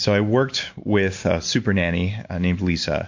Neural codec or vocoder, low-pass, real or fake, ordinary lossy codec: none; 7.2 kHz; real; MP3, 48 kbps